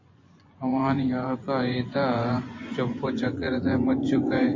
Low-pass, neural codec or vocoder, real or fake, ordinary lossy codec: 7.2 kHz; vocoder, 24 kHz, 100 mel bands, Vocos; fake; MP3, 32 kbps